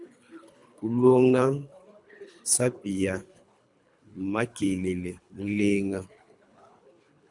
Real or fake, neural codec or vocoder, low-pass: fake; codec, 24 kHz, 3 kbps, HILCodec; 10.8 kHz